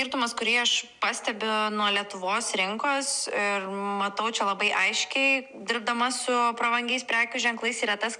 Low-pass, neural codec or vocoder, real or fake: 10.8 kHz; none; real